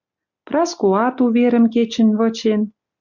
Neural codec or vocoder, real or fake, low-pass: none; real; 7.2 kHz